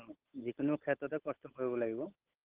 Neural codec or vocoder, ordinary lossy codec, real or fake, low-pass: codec, 16 kHz, 8 kbps, FunCodec, trained on Chinese and English, 25 frames a second; Opus, 16 kbps; fake; 3.6 kHz